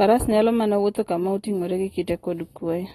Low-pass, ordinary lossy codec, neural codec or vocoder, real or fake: 19.8 kHz; AAC, 32 kbps; none; real